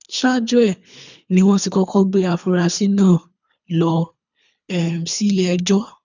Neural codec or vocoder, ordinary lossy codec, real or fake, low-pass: codec, 24 kHz, 3 kbps, HILCodec; none; fake; 7.2 kHz